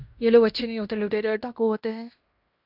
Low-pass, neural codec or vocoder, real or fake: 5.4 kHz; codec, 16 kHz in and 24 kHz out, 0.9 kbps, LongCat-Audio-Codec, fine tuned four codebook decoder; fake